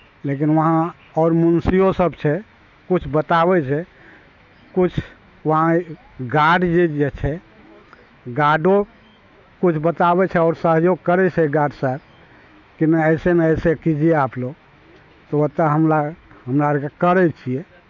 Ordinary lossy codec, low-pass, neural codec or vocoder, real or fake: none; 7.2 kHz; autoencoder, 48 kHz, 128 numbers a frame, DAC-VAE, trained on Japanese speech; fake